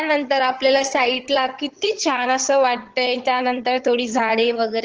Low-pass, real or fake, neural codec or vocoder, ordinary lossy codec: 7.2 kHz; fake; vocoder, 22.05 kHz, 80 mel bands, HiFi-GAN; Opus, 16 kbps